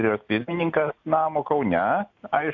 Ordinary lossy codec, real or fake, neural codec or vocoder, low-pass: AAC, 48 kbps; real; none; 7.2 kHz